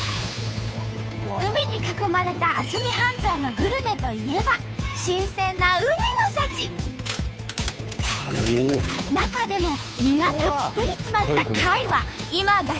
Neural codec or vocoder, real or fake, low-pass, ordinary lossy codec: codec, 16 kHz, 2 kbps, FunCodec, trained on Chinese and English, 25 frames a second; fake; none; none